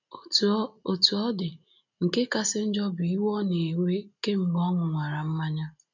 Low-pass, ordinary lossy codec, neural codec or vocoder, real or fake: 7.2 kHz; none; none; real